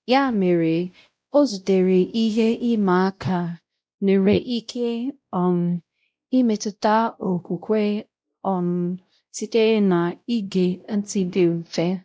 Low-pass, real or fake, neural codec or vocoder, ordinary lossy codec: none; fake; codec, 16 kHz, 0.5 kbps, X-Codec, WavLM features, trained on Multilingual LibriSpeech; none